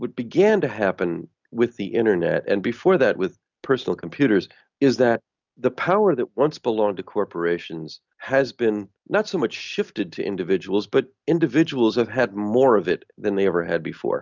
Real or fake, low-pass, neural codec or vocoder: real; 7.2 kHz; none